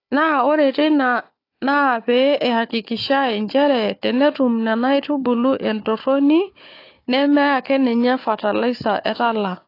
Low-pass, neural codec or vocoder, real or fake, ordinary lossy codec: 5.4 kHz; codec, 16 kHz, 4 kbps, FunCodec, trained on Chinese and English, 50 frames a second; fake; AAC, 32 kbps